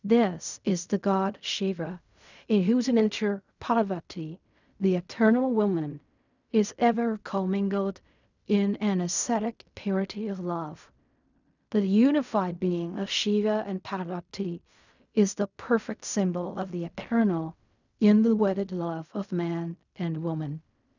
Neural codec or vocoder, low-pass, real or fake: codec, 16 kHz in and 24 kHz out, 0.4 kbps, LongCat-Audio-Codec, fine tuned four codebook decoder; 7.2 kHz; fake